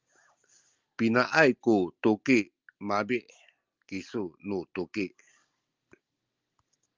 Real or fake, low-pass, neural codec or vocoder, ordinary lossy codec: real; 7.2 kHz; none; Opus, 24 kbps